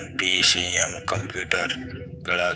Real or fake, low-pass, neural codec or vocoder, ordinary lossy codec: fake; none; codec, 16 kHz, 4 kbps, X-Codec, HuBERT features, trained on general audio; none